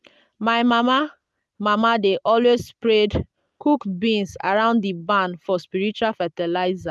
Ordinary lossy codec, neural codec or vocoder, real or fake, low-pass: none; none; real; none